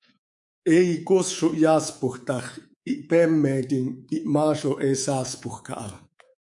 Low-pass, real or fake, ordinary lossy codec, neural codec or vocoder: 10.8 kHz; fake; MP3, 64 kbps; codec, 24 kHz, 3.1 kbps, DualCodec